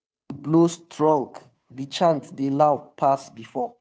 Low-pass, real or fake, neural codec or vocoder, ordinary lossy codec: none; fake; codec, 16 kHz, 2 kbps, FunCodec, trained on Chinese and English, 25 frames a second; none